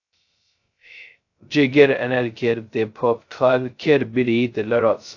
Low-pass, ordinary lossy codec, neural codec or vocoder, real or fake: 7.2 kHz; AAC, 48 kbps; codec, 16 kHz, 0.2 kbps, FocalCodec; fake